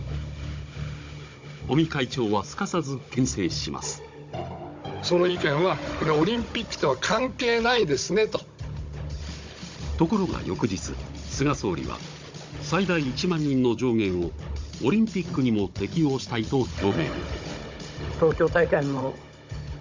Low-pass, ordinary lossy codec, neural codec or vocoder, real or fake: 7.2 kHz; MP3, 48 kbps; codec, 16 kHz, 16 kbps, FunCodec, trained on Chinese and English, 50 frames a second; fake